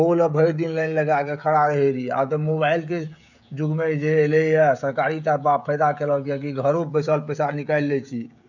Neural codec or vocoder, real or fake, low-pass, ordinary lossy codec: codec, 16 kHz, 16 kbps, FreqCodec, smaller model; fake; 7.2 kHz; none